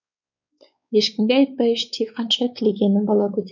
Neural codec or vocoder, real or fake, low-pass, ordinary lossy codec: codec, 16 kHz, 4 kbps, X-Codec, WavLM features, trained on Multilingual LibriSpeech; fake; 7.2 kHz; none